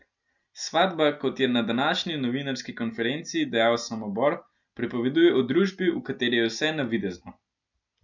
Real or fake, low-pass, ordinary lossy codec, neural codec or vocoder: real; 7.2 kHz; none; none